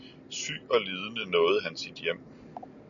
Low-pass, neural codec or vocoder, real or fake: 7.2 kHz; none; real